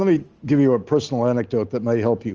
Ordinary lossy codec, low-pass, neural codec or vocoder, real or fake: Opus, 24 kbps; 7.2 kHz; none; real